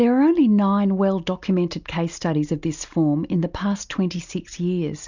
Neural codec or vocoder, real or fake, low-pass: none; real; 7.2 kHz